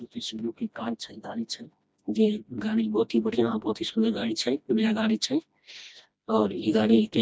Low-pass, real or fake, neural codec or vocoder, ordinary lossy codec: none; fake; codec, 16 kHz, 1 kbps, FreqCodec, smaller model; none